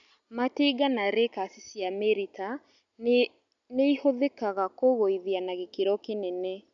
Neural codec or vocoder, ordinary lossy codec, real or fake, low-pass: none; none; real; 7.2 kHz